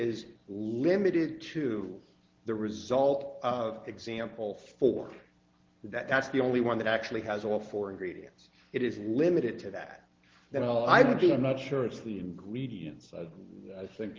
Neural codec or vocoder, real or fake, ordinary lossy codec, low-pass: none; real; Opus, 16 kbps; 7.2 kHz